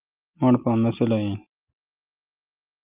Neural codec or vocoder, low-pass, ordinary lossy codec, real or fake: none; 3.6 kHz; Opus, 32 kbps; real